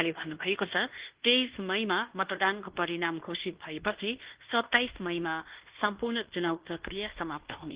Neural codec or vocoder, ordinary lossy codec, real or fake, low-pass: codec, 16 kHz in and 24 kHz out, 0.9 kbps, LongCat-Audio-Codec, fine tuned four codebook decoder; Opus, 16 kbps; fake; 3.6 kHz